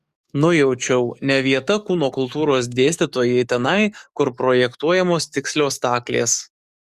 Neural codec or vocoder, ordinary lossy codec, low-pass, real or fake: codec, 44.1 kHz, 7.8 kbps, DAC; Opus, 64 kbps; 14.4 kHz; fake